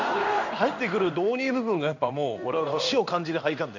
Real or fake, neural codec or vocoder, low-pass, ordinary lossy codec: fake; codec, 16 kHz in and 24 kHz out, 1 kbps, XY-Tokenizer; 7.2 kHz; none